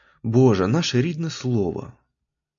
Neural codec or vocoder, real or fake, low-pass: none; real; 7.2 kHz